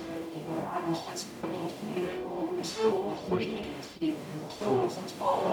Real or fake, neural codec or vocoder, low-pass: fake; codec, 44.1 kHz, 0.9 kbps, DAC; 19.8 kHz